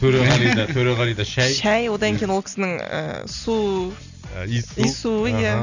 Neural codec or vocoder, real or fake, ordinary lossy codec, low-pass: none; real; none; 7.2 kHz